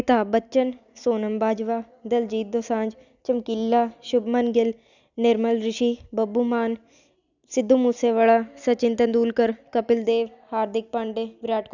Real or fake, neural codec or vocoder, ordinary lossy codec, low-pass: real; none; none; 7.2 kHz